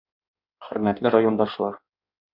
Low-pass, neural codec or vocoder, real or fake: 5.4 kHz; codec, 16 kHz in and 24 kHz out, 1.1 kbps, FireRedTTS-2 codec; fake